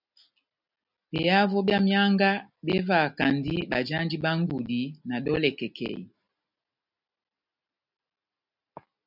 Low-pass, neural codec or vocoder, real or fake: 5.4 kHz; none; real